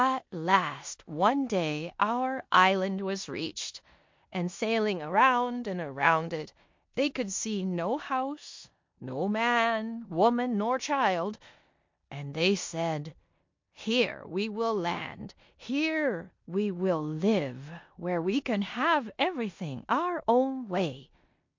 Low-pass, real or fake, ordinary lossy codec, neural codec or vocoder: 7.2 kHz; fake; MP3, 48 kbps; codec, 16 kHz in and 24 kHz out, 0.9 kbps, LongCat-Audio-Codec, four codebook decoder